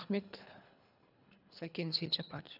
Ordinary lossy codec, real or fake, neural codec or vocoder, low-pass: none; fake; codec, 24 kHz, 3 kbps, HILCodec; 5.4 kHz